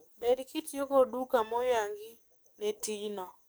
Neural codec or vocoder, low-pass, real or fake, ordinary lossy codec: codec, 44.1 kHz, 7.8 kbps, DAC; none; fake; none